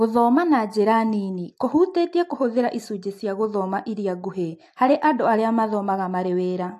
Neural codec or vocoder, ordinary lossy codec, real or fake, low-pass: none; AAC, 64 kbps; real; 14.4 kHz